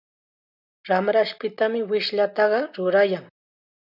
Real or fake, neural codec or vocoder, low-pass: real; none; 5.4 kHz